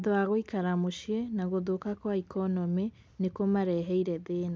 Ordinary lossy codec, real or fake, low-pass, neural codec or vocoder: none; real; none; none